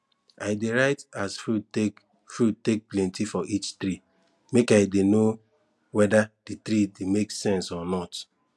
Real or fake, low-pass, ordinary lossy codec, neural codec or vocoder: real; none; none; none